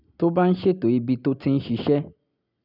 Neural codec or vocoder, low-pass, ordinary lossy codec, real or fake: none; 5.4 kHz; none; real